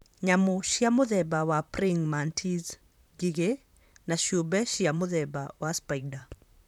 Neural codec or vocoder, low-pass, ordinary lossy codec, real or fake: vocoder, 44.1 kHz, 128 mel bands, Pupu-Vocoder; 19.8 kHz; none; fake